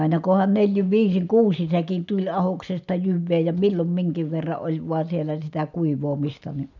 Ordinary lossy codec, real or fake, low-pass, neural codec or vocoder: none; real; 7.2 kHz; none